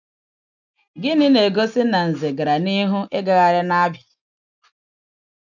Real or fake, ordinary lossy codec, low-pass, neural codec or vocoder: real; none; 7.2 kHz; none